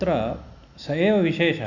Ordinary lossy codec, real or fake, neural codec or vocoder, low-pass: none; real; none; 7.2 kHz